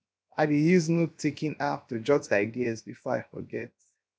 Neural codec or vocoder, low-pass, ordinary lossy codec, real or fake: codec, 16 kHz, 0.7 kbps, FocalCodec; none; none; fake